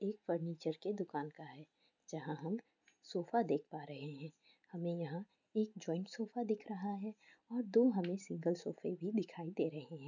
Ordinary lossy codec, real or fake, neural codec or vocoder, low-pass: none; real; none; 7.2 kHz